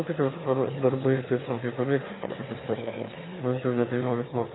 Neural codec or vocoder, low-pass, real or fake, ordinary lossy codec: autoencoder, 22.05 kHz, a latent of 192 numbers a frame, VITS, trained on one speaker; 7.2 kHz; fake; AAC, 16 kbps